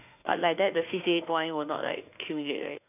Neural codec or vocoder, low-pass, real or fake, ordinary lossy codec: autoencoder, 48 kHz, 32 numbers a frame, DAC-VAE, trained on Japanese speech; 3.6 kHz; fake; none